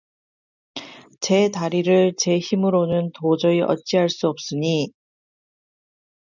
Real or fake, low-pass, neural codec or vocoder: real; 7.2 kHz; none